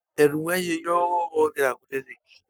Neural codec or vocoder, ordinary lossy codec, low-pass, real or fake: codec, 44.1 kHz, 7.8 kbps, Pupu-Codec; none; none; fake